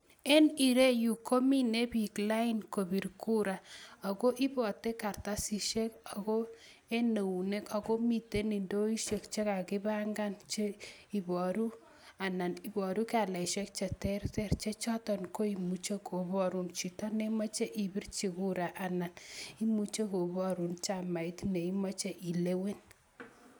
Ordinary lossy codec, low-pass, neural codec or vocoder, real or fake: none; none; none; real